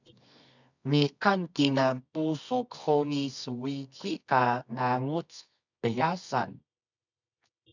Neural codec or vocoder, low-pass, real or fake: codec, 24 kHz, 0.9 kbps, WavTokenizer, medium music audio release; 7.2 kHz; fake